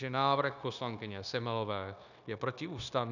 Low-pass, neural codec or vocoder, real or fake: 7.2 kHz; codec, 16 kHz, 0.9 kbps, LongCat-Audio-Codec; fake